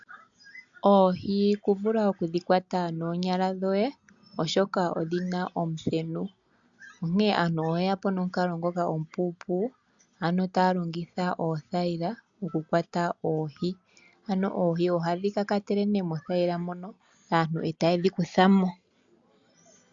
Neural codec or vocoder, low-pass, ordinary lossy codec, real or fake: none; 7.2 kHz; MP3, 64 kbps; real